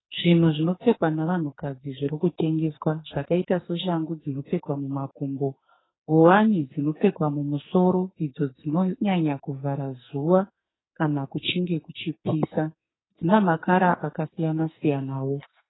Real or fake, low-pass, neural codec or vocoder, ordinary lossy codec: fake; 7.2 kHz; codec, 44.1 kHz, 2.6 kbps, SNAC; AAC, 16 kbps